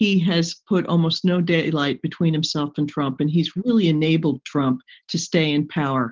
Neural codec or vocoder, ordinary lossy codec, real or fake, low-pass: none; Opus, 16 kbps; real; 7.2 kHz